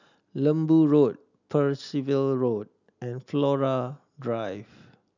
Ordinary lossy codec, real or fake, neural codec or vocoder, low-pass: none; real; none; 7.2 kHz